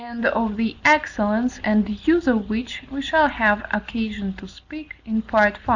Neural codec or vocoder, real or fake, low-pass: none; real; 7.2 kHz